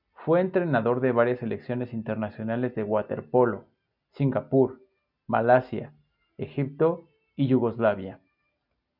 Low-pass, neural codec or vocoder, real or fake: 5.4 kHz; none; real